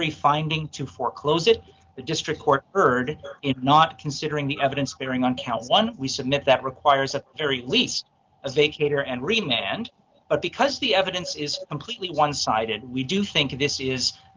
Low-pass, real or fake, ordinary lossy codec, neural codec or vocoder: 7.2 kHz; real; Opus, 32 kbps; none